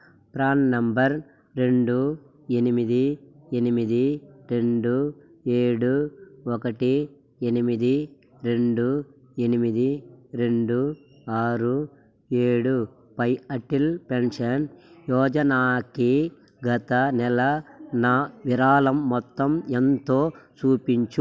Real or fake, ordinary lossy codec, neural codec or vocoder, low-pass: real; none; none; none